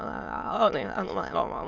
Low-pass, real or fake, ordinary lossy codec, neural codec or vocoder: 7.2 kHz; fake; MP3, 64 kbps; autoencoder, 22.05 kHz, a latent of 192 numbers a frame, VITS, trained on many speakers